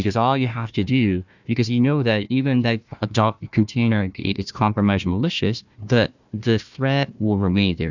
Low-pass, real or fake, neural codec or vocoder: 7.2 kHz; fake; codec, 16 kHz, 1 kbps, FunCodec, trained on Chinese and English, 50 frames a second